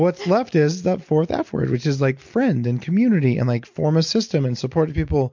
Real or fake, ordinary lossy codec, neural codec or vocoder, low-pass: real; MP3, 48 kbps; none; 7.2 kHz